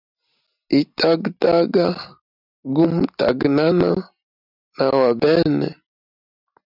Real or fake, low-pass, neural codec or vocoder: real; 5.4 kHz; none